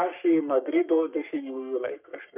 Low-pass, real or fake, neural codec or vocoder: 3.6 kHz; fake; codec, 44.1 kHz, 3.4 kbps, Pupu-Codec